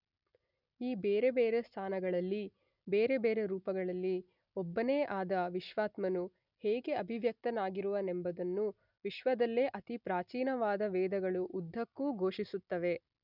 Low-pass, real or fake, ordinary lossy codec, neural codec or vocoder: 5.4 kHz; real; none; none